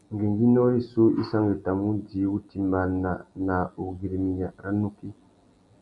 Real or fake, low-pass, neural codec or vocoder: real; 10.8 kHz; none